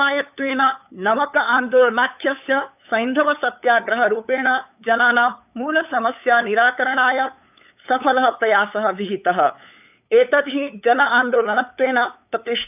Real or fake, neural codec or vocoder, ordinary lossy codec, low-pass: fake; codec, 16 kHz, 8 kbps, FunCodec, trained on LibriTTS, 25 frames a second; none; 3.6 kHz